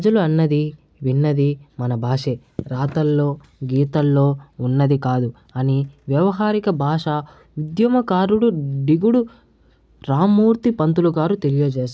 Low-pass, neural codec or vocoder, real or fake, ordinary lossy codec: none; none; real; none